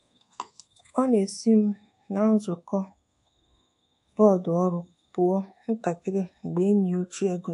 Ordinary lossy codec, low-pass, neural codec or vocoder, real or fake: AAC, 64 kbps; 10.8 kHz; codec, 24 kHz, 1.2 kbps, DualCodec; fake